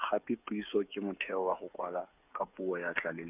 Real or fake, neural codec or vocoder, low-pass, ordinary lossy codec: fake; codec, 16 kHz, 8 kbps, FreqCodec, smaller model; 3.6 kHz; none